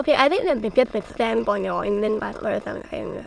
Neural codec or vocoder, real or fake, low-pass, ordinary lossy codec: autoencoder, 22.05 kHz, a latent of 192 numbers a frame, VITS, trained on many speakers; fake; none; none